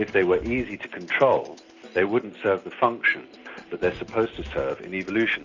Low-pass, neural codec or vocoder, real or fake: 7.2 kHz; none; real